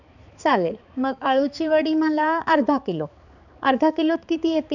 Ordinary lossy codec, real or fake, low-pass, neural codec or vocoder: none; fake; 7.2 kHz; codec, 16 kHz, 4 kbps, X-Codec, HuBERT features, trained on balanced general audio